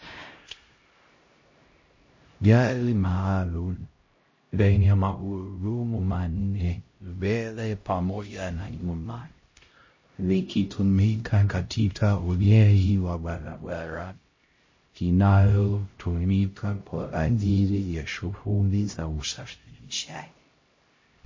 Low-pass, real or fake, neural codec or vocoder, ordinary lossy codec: 7.2 kHz; fake; codec, 16 kHz, 0.5 kbps, X-Codec, HuBERT features, trained on LibriSpeech; MP3, 32 kbps